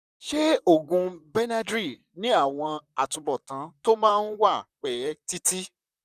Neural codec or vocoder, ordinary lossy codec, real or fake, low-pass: vocoder, 44.1 kHz, 128 mel bands, Pupu-Vocoder; none; fake; 14.4 kHz